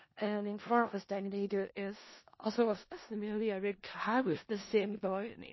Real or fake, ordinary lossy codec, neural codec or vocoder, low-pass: fake; MP3, 24 kbps; codec, 16 kHz in and 24 kHz out, 0.4 kbps, LongCat-Audio-Codec, four codebook decoder; 7.2 kHz